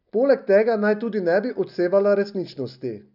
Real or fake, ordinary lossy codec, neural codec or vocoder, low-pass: real; none; none; 5.4 kHz